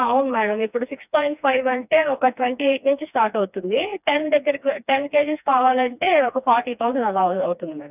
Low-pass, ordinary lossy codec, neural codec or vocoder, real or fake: 3.6 kHz; none; codec, 16 kHz, 2 kbps, FreqCodec, smaller model; fake